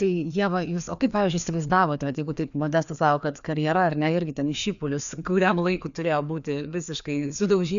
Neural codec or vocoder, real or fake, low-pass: codec, 16 kHz, 2 kbps, FreqCodec, larger model; fake; 7.2 kHz